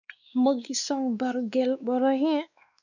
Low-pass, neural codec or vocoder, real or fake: 7.2 kHz; codec, 16 kHz, 2 kbps, X-Codec, WavLM features, trained on Multilingual LibriSpeech; fake